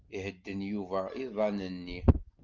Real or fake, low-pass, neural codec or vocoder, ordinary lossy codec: real; 7.2 kHz; none; Opus, 32 kbps